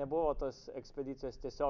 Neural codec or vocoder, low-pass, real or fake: none; 7.2 kHz; real